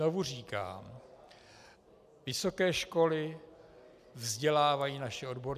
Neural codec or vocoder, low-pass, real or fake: none; 14.4 kHz; real